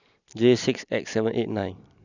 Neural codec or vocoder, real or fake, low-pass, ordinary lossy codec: none; real; 7.2 kHz; none